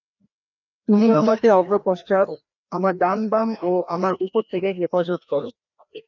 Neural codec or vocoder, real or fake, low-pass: codec, 16 kHz, 1 kbps, FreqCodec, larger model; fake; 7.2 kHz